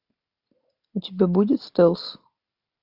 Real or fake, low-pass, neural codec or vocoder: real; 5.4 kHz; none